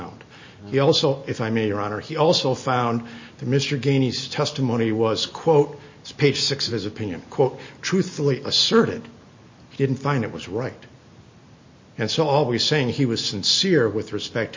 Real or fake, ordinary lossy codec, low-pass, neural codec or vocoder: real; MP3, 32 kbps; 7.2 kHz; none